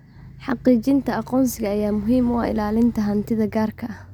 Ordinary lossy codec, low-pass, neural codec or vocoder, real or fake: none; 19.8 kHz; none; real